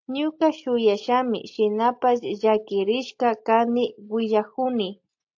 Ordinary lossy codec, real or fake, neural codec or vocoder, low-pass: AAC, 48 kbps; real; none; 7.2 kHz